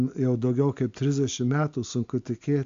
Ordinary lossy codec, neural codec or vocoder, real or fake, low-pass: AAC, 64 kbps; none; real; 7.2 kHz